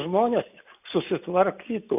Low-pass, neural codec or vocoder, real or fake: 3.6 kHz; none; real